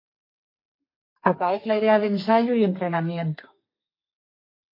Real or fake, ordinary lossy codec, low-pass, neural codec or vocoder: fake; MP3, 32 kbps; 5.4 kHz; codec, 32 kHz, 1.9 kbps, SNAC